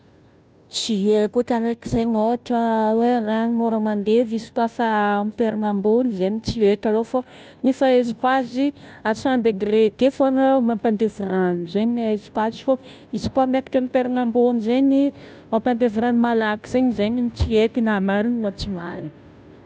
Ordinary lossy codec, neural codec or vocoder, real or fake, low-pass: none; codec, 16 kHz, 0.5 kbps, FunCodec, trained on Chinese and English, 25 frames a second; fake; none